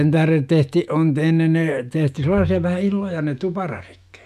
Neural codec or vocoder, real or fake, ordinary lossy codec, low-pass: autoencoder, 48 kHz, 128 numbers a frame, DAC-VAE, trained on Japanese speech; fake; none; 14.4 kHz